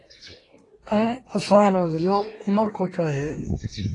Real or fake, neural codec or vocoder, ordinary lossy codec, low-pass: fake; codec, 24 kHz, 1 kbps, SNAC; AAC, 32 kbps; 10.8 kHz